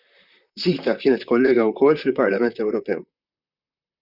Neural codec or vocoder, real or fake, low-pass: vocoder, 44.1 kHz, 128 mel bands, Pupu-Vocoder; fake; 5.4 kHz